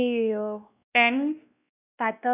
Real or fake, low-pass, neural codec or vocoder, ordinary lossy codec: fake; 3.6 kHz; codec, 16 kHz, 1 kbps, X-Codec, WavLM features, trained on Multilingual LibriSpeech; none